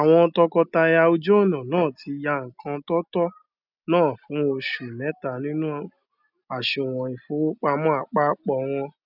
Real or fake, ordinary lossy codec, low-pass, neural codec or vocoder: real; none; 5.4 kHz; none